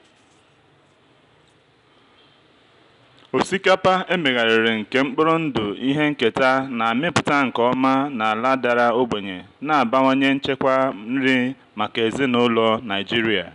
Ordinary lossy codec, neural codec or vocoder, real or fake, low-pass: none; none; real; 10.8 kHz